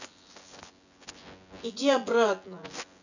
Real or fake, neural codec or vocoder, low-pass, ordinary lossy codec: fake; vocoder, 24 kHz, 100 mel bands, Vocos; 7.2 kHz; none